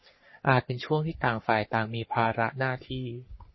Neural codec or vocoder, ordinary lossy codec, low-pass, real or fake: codec, 44.1 kHz, 3.4 kbps, Pupu-Codec; MP3, 24 kbps; 7.2 kHz; fake